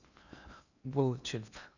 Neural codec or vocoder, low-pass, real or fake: codec, 16 kHz in and 24 kHz out, 0.6 kbps, FocalCodec, streaming, 2048 codes; 7.2 kHz; fake